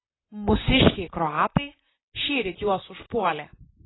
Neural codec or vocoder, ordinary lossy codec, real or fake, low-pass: vocoder, 44.1 kHz, 128 mel bands, Pupu-Vocoder; AAC, 16 kbps; fake; 7.2 kHz